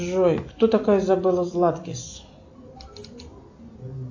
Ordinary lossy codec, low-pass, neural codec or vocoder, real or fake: AAC, 48 kbps; 7.2 kHz; none; real